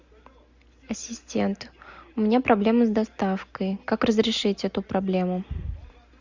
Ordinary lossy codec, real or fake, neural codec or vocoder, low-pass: Opus, 64 kbps; real; none; 7.2 kHz